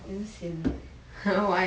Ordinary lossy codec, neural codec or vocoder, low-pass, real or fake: none; none; none; real